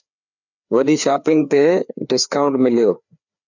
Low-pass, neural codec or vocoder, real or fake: 7.2 kHz; codec, 16 kHz, 2 kbps, FreqCodec, larger model; fake